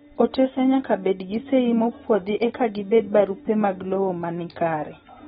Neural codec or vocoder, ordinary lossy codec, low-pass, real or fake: none; AAC, 16 kbps; 19.8 kHz; real